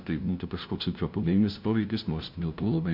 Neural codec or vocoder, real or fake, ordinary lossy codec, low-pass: codec, 16 kHz, 0.5 kbps, FunCodec, trained on LibriTTS, 25 frames a second; fake; MP3, 48 kbps; 5.4 kHz